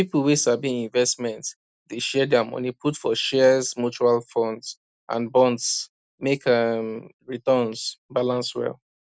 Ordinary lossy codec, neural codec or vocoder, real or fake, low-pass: none; none; real; none